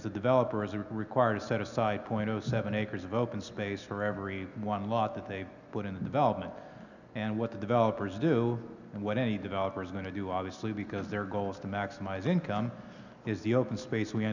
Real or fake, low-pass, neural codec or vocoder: real; 7.2 kHz; none